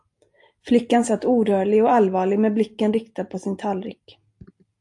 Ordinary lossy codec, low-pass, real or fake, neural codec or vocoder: AAC, 64 kbps; 10.8 kHz; real; none